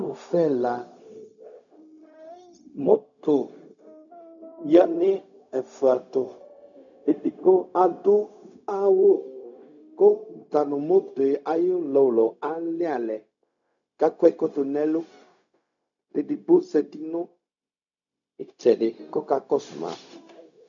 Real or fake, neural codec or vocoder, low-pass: fake; codec, 16 kHz, 0.4 kbps, LongCat-Audio-Codec; 7.2 kHz